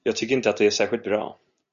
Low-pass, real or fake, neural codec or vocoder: 7.2 kHz; real; none